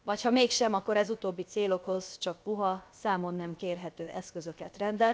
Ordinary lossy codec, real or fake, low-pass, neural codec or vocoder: none; fake; none; codec, 16 kHz, about 1 kbps, DyCAST, with the encoder's durations